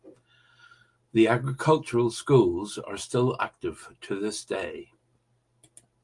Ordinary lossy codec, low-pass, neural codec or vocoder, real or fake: Opus, 32 kbps; 10.8 kHz; none; real